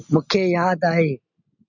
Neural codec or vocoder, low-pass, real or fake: none; 7.2 kHz; real